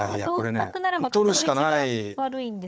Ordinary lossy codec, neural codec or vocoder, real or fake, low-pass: none; codec, 16 kHz, 4 kbps, FunCodec, trained on Chinese and English, 50 frames a second; fake; none